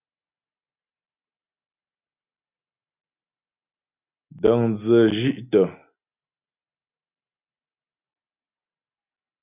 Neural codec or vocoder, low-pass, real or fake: none; 3.6 kHz; real